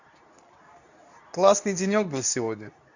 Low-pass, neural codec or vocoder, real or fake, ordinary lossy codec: 7.2 kHz; codec, 24 kHz, 0.9 kbps, WavTokenizer, medium speech release version 2; fake; none